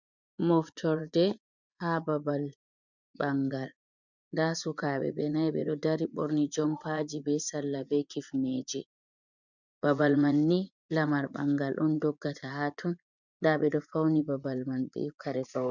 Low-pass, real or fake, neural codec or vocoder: 7.2 kHz; fake; vocoder, 24 kHz, 100 mel bands, Vocos